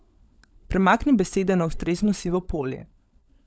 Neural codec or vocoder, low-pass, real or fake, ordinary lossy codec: codec, 16 kHz, 16 kbps, FunCodec, trained on LibriTTS, 50 frames a second; none; fake; none